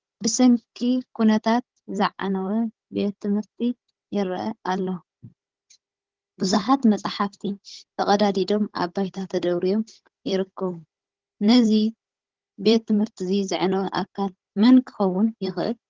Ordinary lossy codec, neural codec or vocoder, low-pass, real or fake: Opus, 16 kbps; codec, 16 kHz, 16 kbps, FunCodec, trained on Chinese and English, 50 frames a second; 7.2 kHz; fake